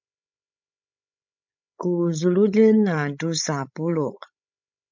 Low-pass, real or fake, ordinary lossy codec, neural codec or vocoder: 7.2 kHz; fake; MP3, 64 kbps; codec, 16 kHz, 16 kbps, FreqCodec, larger model